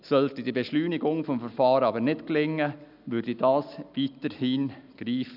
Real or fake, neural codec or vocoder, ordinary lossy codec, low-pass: real; none; none; 5.4 kHz